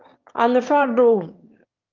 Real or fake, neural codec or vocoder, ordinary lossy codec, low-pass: fake; autoencoder, 22.05 kHz, a latent of 192 numbers a frame, VITS, trained on one speaker; Opus, 24 kbps; 7.2 kHz